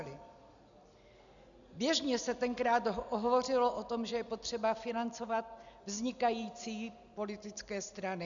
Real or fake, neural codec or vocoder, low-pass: real; none; 7.2 kHz